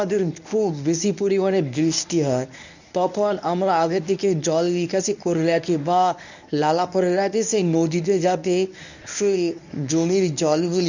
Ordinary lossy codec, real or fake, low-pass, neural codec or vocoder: none; fake; 7.2 kHz; codec, 24 kHz, 0.9 kbps, WavTokenizer, medium speech release version 1